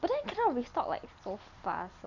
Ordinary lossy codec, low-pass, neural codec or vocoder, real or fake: none; 7.2 kHz; none; real